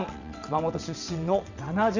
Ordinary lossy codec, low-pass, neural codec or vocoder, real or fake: none; 7.2 kHz; vocoder, 22.05 kHz, 80 mel bands, WaveNeXt; fake